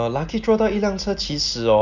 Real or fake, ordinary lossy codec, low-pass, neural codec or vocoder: real; none; 7.2 kHz; none